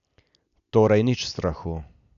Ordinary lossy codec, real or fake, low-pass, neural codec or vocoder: MP3, 96 kbps; real; 7.2 kHz; none